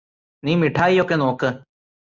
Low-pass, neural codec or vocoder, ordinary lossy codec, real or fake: 7.2 kHz; none; Opus, 64 kbps; real